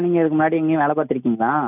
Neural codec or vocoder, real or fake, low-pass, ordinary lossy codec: none; real; 3.6 kHz; none